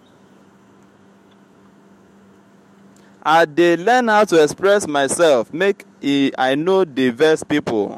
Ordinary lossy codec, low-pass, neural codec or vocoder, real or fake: MP3, 64 kbps; 19.8 kHz; autoencoder, 48 kHz, 128 numbers a frame, DAC-VAE, trained on Japanese speech; fake